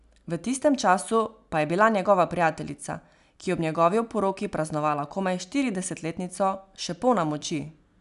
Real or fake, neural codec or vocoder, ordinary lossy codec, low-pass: real; none; none; 10.8 kHz